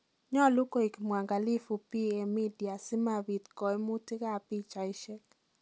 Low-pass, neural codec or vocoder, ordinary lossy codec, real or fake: none; none; none; real